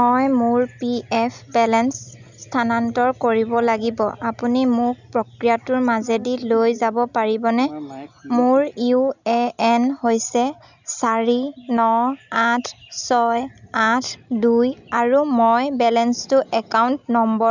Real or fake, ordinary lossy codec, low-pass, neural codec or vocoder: real; none; 7.2 kHz; none